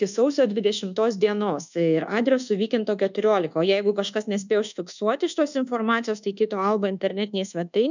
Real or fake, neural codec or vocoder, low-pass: fake; codec, 24 kHz, 1.2 kbps, DualCodec; 7.2 kHz